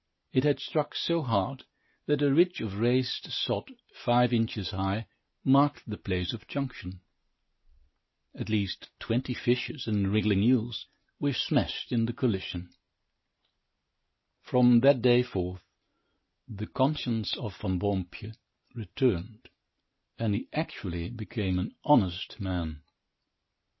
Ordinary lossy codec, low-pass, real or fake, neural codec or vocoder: MP3, 24 kbps; 7.2 kHz; real; none